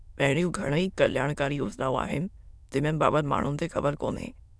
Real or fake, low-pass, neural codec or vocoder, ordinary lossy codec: fake; none; autoencoder, 22.05 kHz, a latent of 192 numbers a frame, VITS, trained on many speakers; none